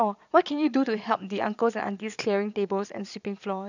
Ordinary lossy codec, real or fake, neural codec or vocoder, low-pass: none; fake; vocoder, 22.05 kHz, 80 mel bands, Vocos; 7.2 kHz